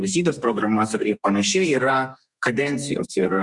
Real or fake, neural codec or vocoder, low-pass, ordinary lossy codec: fake; codec, 32 kHz, 1.9 kbps, SNAC; 10.8 kHz; Opus, 64 kbps